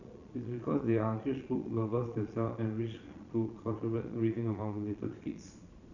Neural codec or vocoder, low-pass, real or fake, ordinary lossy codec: vocoder, 22.05 kHz, 80 mel bands, Vocos; 7.2 kHz; fake; none